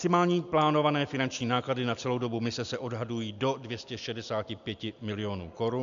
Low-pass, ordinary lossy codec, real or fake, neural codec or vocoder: 7.2 kHz; AAC, 96 kbps; real; none